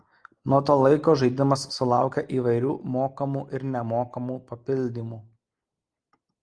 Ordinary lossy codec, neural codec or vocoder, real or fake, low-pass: Opus, 32 kbps; none; real; 9.9 kHz